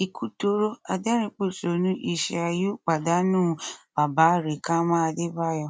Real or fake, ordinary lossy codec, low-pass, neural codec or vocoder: real; none; none; none